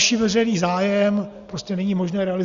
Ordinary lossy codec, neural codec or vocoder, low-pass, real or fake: Opus, 64 kbps; none; 7.2 kHz; real